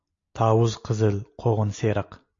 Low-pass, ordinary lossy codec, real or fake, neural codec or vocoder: 7.2 kHz; MP3, 48 kbps; real; none